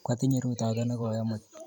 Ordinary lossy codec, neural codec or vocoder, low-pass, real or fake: none; vocoder, 44.1 kHz, 128 mel bands every 512 samples, BigVGAN v2; 19.8 kHz; fake